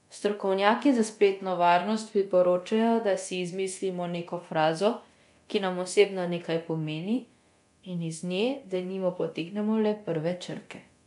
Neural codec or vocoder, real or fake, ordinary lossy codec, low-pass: codec, 24 kHz, 0.9 kbps, DualCodec; fake; none; 10.8 kHz